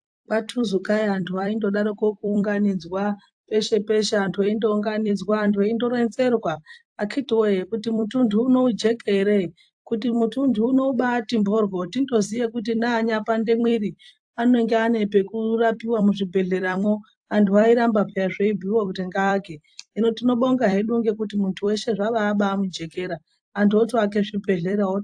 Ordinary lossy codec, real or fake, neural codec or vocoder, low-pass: AAC, 64 kbps; fake; vocoder, 44.1 kHz, 128 mel bands every 256 samples, BigVGAN v2; 9.9 kHz